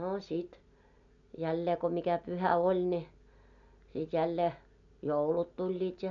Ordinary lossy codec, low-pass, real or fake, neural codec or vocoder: none; 7.2 kHz; real; none